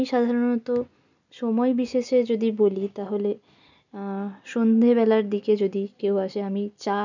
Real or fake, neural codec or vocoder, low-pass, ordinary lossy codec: real; none; 7.2 kHz; none